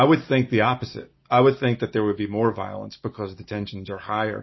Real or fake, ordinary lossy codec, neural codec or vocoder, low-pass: real; MP3, 24 kbps; none; 7.2 kHz